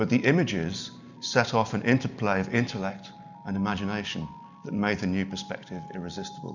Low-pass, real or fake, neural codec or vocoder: 7.2 kHz; real; none